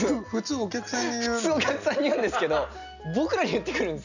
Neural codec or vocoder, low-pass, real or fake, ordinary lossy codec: none; 7.2 kHz; real; none